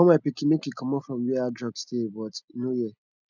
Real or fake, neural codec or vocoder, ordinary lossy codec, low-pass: real; none; none; 7.2 kHz